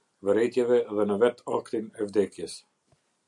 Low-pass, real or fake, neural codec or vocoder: 10.8 kHz; real; none